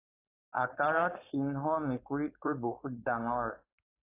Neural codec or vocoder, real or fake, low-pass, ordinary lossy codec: codec, 16 kHz, 4.8 kbps, FACodec; fake; 3.6 kHz; AAC, 24 kbps